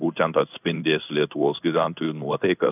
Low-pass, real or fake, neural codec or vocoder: 3.6 kHz; fake; codec, 16 kHz in and 24 kHz out, 1 kbps, XY-Tokenizer